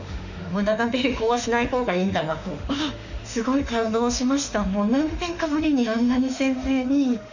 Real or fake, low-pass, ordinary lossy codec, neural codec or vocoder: fake; 7.2 kHz; none; autoencoder, 48 kHz, 32 numbers a frame, DAC-VAE, trained on Japanese speech